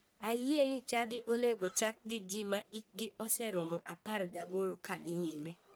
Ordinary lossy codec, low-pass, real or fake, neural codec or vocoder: none; none; fake; codec, 44.1 kHz, 1.7 kbps, Pupu-Codec